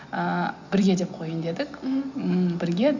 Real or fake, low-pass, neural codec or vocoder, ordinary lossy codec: real; 7.2 kHz; none; none